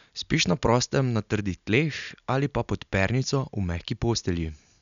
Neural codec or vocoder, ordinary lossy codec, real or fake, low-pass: none; none; real; 7.2 kHz